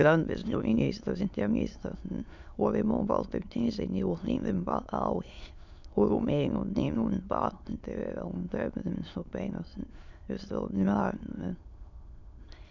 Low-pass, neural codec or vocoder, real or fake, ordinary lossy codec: 7.2 kHz; autoencoder, 22.05 kHz, a latent of 192 numbers a frame, VITS, trained on many speakers; fake; none